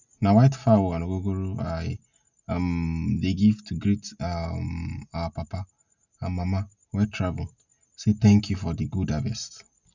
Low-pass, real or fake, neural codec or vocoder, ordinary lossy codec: 7.2 kHz; real; none; none